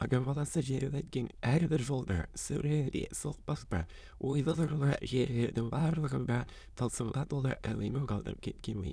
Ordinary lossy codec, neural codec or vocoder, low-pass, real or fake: none; autoencoder, 22.05 kHz, a latent of 192 numbers a frame, VITS, trained on many speakers; none; fake